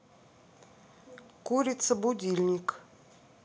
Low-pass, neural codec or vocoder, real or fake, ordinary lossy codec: none; none; real; none